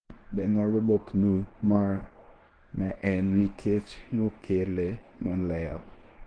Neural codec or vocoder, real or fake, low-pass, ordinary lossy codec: codec, 24 kHz, 0.9 kbps, WavTokenizer, medium speech release version 1; fake; 9.9 kHz; Opus, 16 kbps